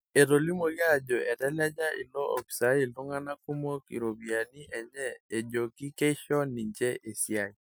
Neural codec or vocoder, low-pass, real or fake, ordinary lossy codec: none; none; real; none